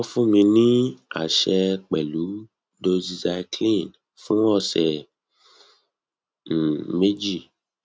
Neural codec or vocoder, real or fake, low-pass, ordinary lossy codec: none; real; none; none